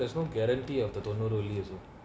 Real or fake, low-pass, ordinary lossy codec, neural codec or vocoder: real; none; none; none